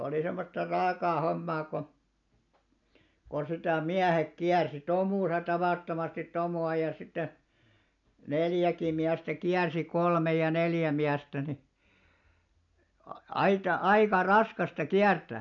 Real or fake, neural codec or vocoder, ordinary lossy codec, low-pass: real; none; none; 7.2 kHz